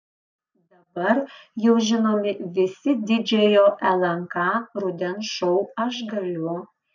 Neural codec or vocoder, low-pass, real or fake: none; 7.2 kHz; real